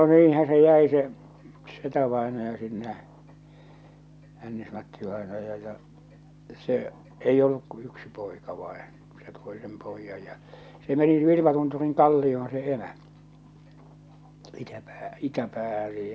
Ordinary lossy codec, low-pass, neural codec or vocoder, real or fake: none; none; none; real